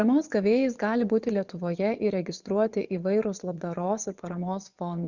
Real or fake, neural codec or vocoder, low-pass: fake; vocoder, 24 kHz, 100 mel bands, Vocos; 7.2 kHz